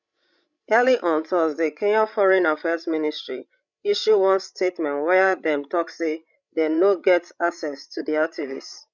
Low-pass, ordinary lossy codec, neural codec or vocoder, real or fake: 7.2 kHz; none; codec, 16 kHz, 16 kbps, FreqCodec, larger model; fake